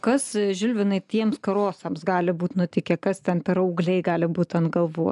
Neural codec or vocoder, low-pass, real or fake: none; 10.8 kHz; real